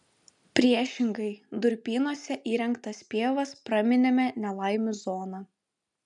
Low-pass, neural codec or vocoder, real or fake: 10.8 kHz; none; real